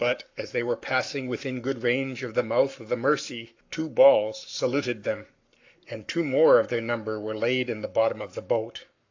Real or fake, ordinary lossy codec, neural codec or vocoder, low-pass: fake; AAC, 48 kbps; codec, 44.1 kHz, 7.8 kbps, Pupu-Codec; 7.2 kHz